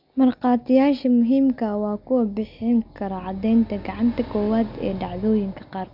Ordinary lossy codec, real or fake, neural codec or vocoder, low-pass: none; real; none; 5.4 kHz